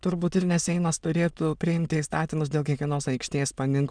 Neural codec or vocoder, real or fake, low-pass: autoencoder, 22.05 kHz, a latent of 192 numbers a frame, VITS, trained on many speakers; fake; 9.9 kHz